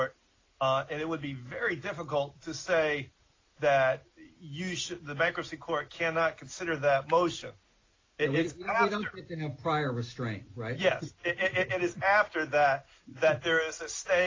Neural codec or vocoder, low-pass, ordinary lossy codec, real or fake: none; 7.2 kHz; AAC, 32 kbps; real